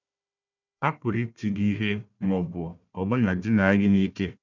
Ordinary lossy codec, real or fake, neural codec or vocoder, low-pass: none; fake; codec, 16 kHz, 1 kbps, FunCodec, trained on Chinese and English, 50 frames a second; 7.2 kHz